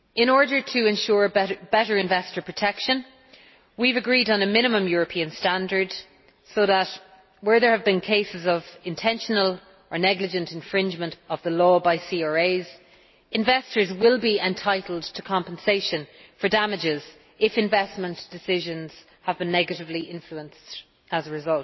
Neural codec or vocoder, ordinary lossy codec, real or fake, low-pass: none; MP3, 24 kbps; real; 7.2 kHz